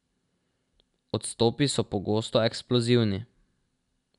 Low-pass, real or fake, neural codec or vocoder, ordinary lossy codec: 10.8 kHz; real; none; none